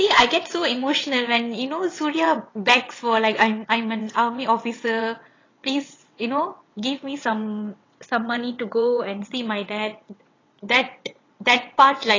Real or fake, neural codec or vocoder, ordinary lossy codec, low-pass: fake; vocoder, 22.05 kHz, 80 mel bands, WaveNeXt; AAC, 32 kbps; 7.2 kHz